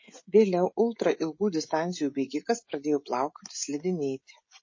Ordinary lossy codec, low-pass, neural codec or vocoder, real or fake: MP3, 32 kbps; 7.2 kHz; codec, 16 kHz, 16 kbps, FreqCodec, smaller model; fake